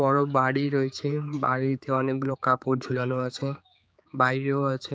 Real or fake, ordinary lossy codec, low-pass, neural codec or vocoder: fake; none; none; codec, 16 kHz, 4 kbps, X-Codec, HuBERT features, trained on general audio